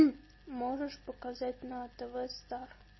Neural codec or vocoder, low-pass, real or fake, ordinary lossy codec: none; 7.2 kHz; real; MP3, 24 kbps